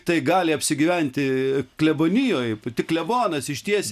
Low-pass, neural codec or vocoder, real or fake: 14.4 kHz; none; real